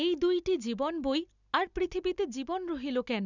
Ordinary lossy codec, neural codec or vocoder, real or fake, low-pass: none; none; real; 7.2 kHz